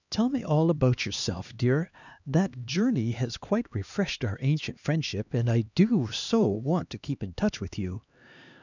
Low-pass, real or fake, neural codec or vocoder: 7.2 kHz; fake; codec, 16 kHz, 2 kbps, X-Codec, HuBERT features, trained on LibriSpeech